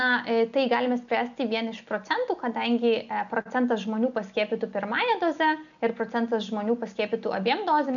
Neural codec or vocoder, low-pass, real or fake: none; 7.2 kHz; real